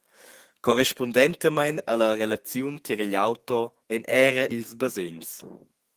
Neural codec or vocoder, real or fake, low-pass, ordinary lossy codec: codec, 32 kHz, 1.9 kbps, SNAC; fake; 14.4 kHz; Opus, 24 kbps